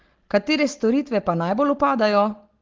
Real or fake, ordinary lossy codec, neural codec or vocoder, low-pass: real; Opus, 16 kbps; none; 7.2 kHz